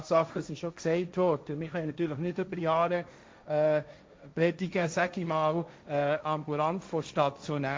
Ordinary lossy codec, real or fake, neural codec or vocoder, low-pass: none; fake; codec, 16 kHz, 1.1 kbps, Voila-Tokenizer; none